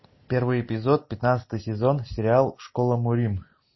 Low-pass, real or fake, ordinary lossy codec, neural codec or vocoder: 7.2 kHz; real; MP3, 24 kbps; none